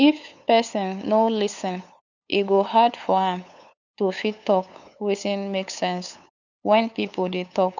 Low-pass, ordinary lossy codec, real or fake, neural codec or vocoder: 7.2 kHz; none; fake; codec, 16 kHz, 8 kbps, FunCodec, trained on LibriTTS, 25 frames a second